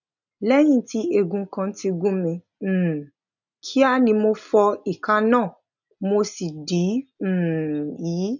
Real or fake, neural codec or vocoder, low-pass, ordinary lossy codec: real; none; 7.2 kHz; none